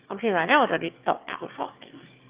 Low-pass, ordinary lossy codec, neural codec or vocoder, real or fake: 3.6 kHz; Opus, 24 kbps; autoencoder, 22.05 kHz, a latent of 192 numbers a frame, VITS, trained on one speaker; fake